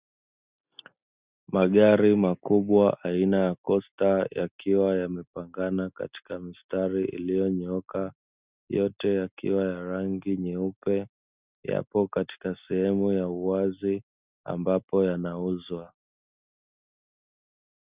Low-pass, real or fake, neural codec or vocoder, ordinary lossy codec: 3.6 kHz; real; none; Opus, 64 kbps